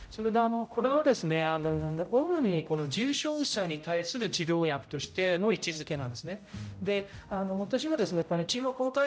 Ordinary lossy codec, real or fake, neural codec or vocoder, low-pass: none; fake; codec, 16 kHz, 0.5 kbps, X-Codec, HuBERT features, trained on general audio; none